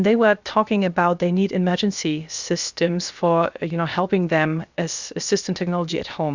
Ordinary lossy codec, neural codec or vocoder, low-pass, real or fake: Opus, 64 kbps; codec, 16 kHz, 0.7 kbps, FocalCodec; 7.2 kHz; fake